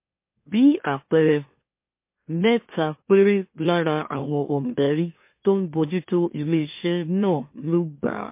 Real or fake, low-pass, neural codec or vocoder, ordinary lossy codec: fake; 3.6 kHz; autoencoder, 44.1 kHz, a latent of 192 numbers a frame, MeloTTS; MP3, 24 kbps